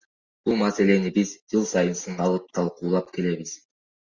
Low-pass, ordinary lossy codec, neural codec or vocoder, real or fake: 7.2 kHz; Opus, 64 kbps; none; real